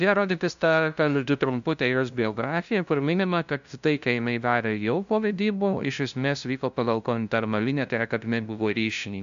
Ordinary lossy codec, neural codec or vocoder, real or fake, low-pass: AAC, 96 kbps; codec, 16 kHz, 0.5 kbps, FunCodec, trained on LibriTTS, 25 frames a second; fake; 7.2 kHz